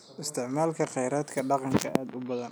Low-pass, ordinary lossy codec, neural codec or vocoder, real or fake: none; none; none; real